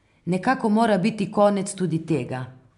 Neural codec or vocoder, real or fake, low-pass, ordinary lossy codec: none; real; 10.8 kHz; MP3, 96 kbps